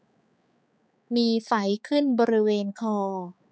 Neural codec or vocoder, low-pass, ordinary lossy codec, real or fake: codec, 16 kHz, 4 kbps, X-Codec, HuBERT features, trained on balanced general audio; none; none; fake